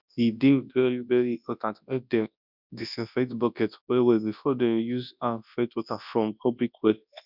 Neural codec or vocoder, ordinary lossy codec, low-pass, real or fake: codec, 24 kHz, 0.9 kbps, WavTokenizer, large speech release; none; 5.4 kHz; fake